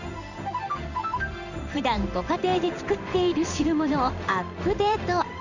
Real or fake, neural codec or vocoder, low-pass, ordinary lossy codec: fake; codec, 16 kHz in and 24 kHz out, 1 kbps, XY-Tokenizer; 7.2 kHz; none